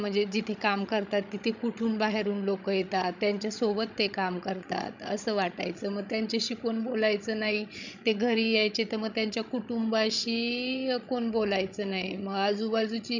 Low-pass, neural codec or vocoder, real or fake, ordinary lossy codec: 7.2 kHz; codec, 16 kHz, 16 kbps, FreqCodec, larger model; fake; none